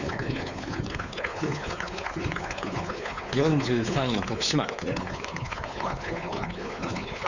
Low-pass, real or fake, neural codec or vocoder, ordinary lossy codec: 7.2 kHz; fake; codec, 16 kHz, 4 kbps, X-Codec, WavLM features, trained on Multilingual LibriSpeech; none